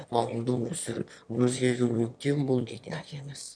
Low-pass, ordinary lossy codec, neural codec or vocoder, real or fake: 9.9 kHz; none; autoencoder, 22.05 kHz, a latent of 192 numbers a frame, VITS, trained on one speaker; fake